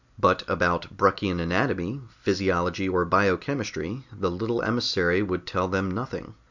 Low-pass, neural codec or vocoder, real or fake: 7.2 kHz; none; real